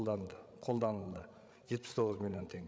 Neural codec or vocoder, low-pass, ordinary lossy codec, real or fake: codec, 16 kHz, 16 kbps, FreqCodec, larger model; none; none; fake